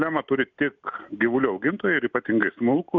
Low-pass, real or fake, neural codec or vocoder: 7.2 kHz; real; none